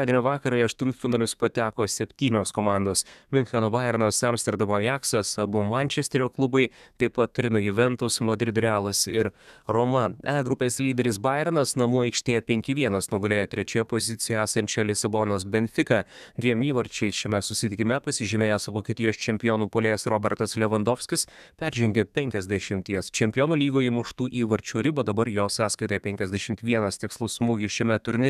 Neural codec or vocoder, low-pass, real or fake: codec, 32 kHz, 1.9 kbps, SNAC; 14.4 kHz; fake